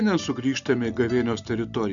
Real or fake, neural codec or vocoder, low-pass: real; none; 7.2 kHz